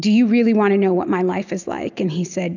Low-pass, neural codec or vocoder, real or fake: 7.2 kHz; none; real